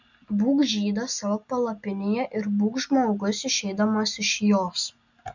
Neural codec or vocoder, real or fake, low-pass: none; real; 7.2 kHz